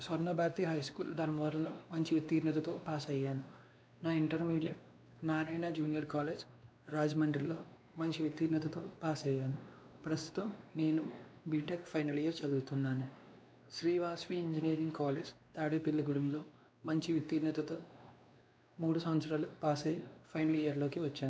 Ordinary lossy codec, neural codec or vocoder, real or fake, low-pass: none; codec, 16 kHz, 2 kbps, X-Codec, WavLM features, trained on Multilingual LibriSpeech; fake; none